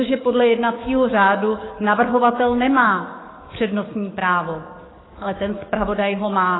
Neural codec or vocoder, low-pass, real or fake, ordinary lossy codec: codec, 44.1 kHz, 7.8 kbps, DAC; 7.2 kHz; fake; AAC, 16 kbps